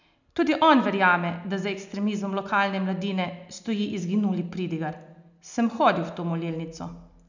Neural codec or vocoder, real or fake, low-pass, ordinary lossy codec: none; real; 7.2 kHz; none